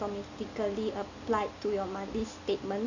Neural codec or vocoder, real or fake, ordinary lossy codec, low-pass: none; real; none; 7.2 kHz